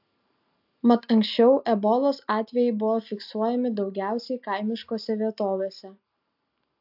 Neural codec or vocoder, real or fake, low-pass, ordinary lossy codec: none; real; 5.4 kHz; AAC, 48 kbps